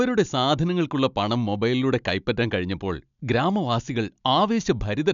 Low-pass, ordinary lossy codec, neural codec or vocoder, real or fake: 7.2 kHz; none; none; real